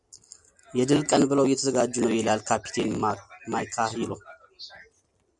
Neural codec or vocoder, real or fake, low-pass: none; real; 10.8 kHz